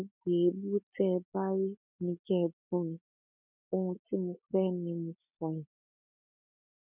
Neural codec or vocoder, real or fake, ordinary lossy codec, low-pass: none; real; none; 3.6 kHz